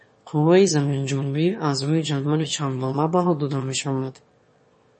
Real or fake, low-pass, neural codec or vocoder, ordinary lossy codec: fake; 9.9 kHz; autoencoder, 22.05 kHz, a latent of 192 numbers a frame, VITS, trained on one speaker; MP3, 32 kbps